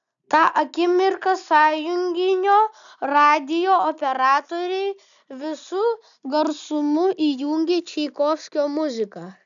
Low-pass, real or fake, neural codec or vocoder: 7.2 kHz; real; none